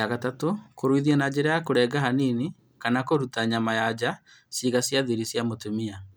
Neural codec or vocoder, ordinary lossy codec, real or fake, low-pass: none; none; real; none